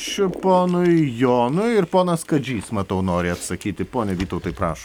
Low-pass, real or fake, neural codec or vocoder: 19.8 kHz; real; none